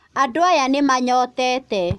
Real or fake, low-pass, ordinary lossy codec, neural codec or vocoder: real; none; none; none